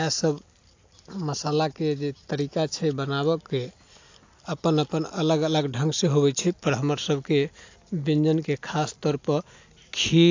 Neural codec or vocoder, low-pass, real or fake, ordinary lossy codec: none; 7.2 kHz; real; AAC, 48 kbps